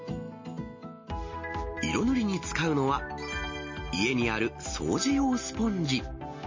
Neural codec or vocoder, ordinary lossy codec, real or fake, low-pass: none; MP3, 32 kbps; real; 7.2 kHz